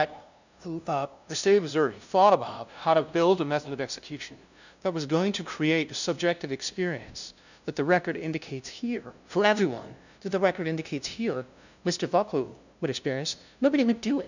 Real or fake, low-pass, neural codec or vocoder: fake; 7.2 kHz; codec, 16 kHz, 0.5 kbps, FunCodec, trained on LibriTTS, 25 frames a second